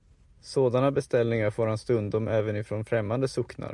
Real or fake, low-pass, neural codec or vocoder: real; 10.8 kHz; none